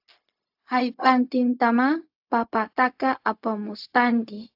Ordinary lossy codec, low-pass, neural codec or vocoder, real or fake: AAC, 48 kbps; 5.4 kHz; codec, 16 kHz, 0.4 kbps, LongCat-Audio-Codec; fake